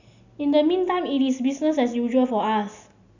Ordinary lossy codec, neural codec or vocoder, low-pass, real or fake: none; none; 7.2 kHz; real